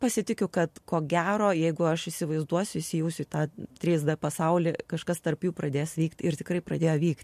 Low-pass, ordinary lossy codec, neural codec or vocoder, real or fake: 14.4 kHz; MP3, 64 kbps; none; real